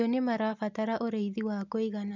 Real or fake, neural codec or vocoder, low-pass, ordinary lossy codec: real; none; 7.2 kHz; none